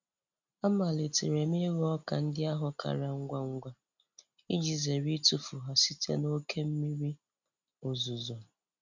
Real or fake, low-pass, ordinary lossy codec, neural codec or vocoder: real; 7.2 kHz; none; none